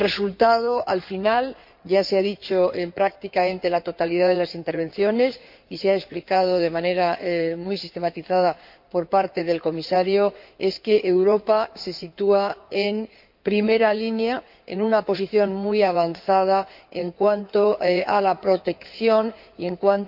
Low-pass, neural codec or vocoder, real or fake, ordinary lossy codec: 5.4 kHz; codec, 16 kHz in and 24 kHz out, 2.2 kbps, FireRedTTS-2 codec; fake; MP3, 48 kbps